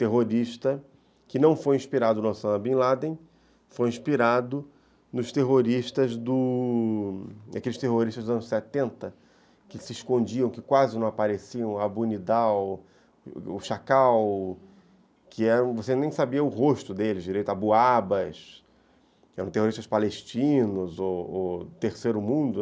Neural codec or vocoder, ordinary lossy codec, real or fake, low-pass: none; none; real; none